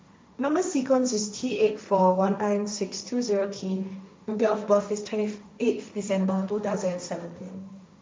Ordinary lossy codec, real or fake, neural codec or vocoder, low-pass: none; fake; codec, 16 kHz, 1.1 kbps, Voila-Tokenizer; none